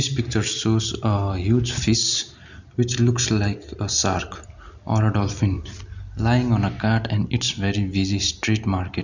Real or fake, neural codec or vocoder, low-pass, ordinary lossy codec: real; none; 7.2 kHz; none